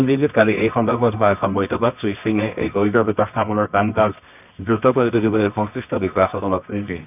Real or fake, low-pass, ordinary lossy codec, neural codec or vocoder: fake; 3.6 kHz; none; codec, 24 kHz, 0.9 kbps, WavTokenizer, medium music audio release